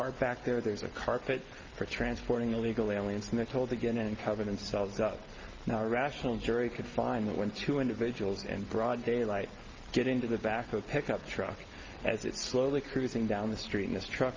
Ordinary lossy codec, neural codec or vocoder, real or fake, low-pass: Opus, 24 kbps; none; real; 7.2 kHz